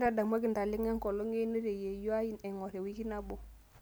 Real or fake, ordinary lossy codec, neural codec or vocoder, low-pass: real; none; none; none